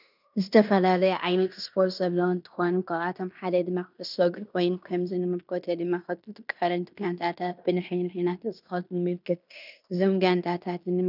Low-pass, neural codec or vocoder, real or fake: 5.4 kHz; codec, 16 kHz in and 24 kHz out, 0.9 kbps, LongCat-Audio-Codec, fine tuned four codebook decoder; fake